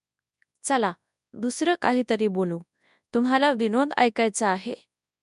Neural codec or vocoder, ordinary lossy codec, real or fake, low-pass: codec, 24 kHz, 0.9 kbps, WavTokenizer, large speech release; none; fake; 10.8 kHz